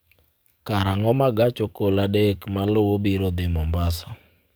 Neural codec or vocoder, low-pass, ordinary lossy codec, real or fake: codec, 44.1 kHz, 7.8 kbps, DAC; none; none; fake